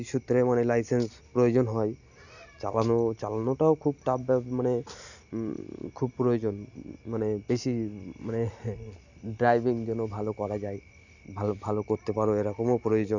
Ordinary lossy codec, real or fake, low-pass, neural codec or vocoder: none; real; 7.2 kHz; none